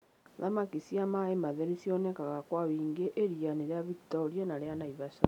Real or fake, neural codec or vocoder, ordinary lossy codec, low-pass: real; none; none; 19.8 kHz